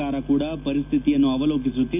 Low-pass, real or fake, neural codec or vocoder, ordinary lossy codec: 3.6 kHz; real; none; none